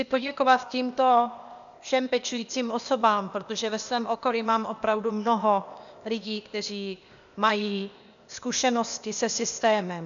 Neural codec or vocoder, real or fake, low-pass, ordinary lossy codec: codec, 16 kHz, 0.8 kbps, ZipCodec; fake; 7.2 kHz; MP3, 96 kbps